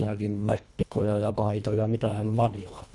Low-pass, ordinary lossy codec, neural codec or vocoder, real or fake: 10.8 kHz; none; codec, 24 kHz, 1.5 kbps, HILCodec; fake